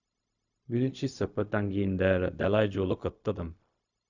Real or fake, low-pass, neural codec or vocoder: fake; 7.2 kHz; codec, 16 kHz, 0.4 kbps, LongCat-Audio-Codec